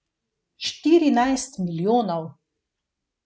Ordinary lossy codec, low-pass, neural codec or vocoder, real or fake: none; none; none; real